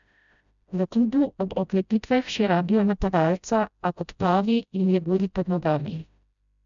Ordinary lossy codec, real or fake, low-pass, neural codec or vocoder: none; fake; 7.2 kHz; codec, 16 kHz, 0.5 kbps, FreqCodec, smaller model